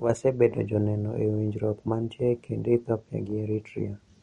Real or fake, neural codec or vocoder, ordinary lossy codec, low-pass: real; none; MP3, 48 kbps; 19.8 kHz